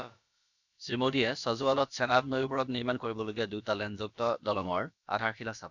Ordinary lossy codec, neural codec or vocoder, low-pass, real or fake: none; codec, 16 kHz, about 1 kbps, DyCAST, with the encoder's durations; 7.2 kHz; fake